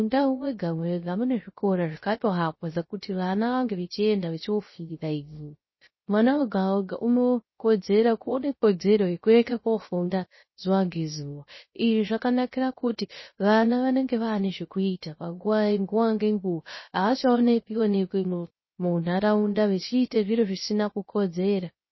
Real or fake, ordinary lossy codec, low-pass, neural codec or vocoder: fake; MP3, 24 kbps; 7.2 kHz; codec, 16 kHz, 0.3 kbps, FocalCodec